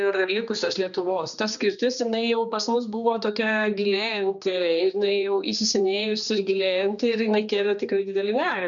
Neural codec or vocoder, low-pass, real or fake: codec, 16 kHz, 2 kbps, X-Codec, HuBERT features, trained on general audio; 7.2 kHz; fake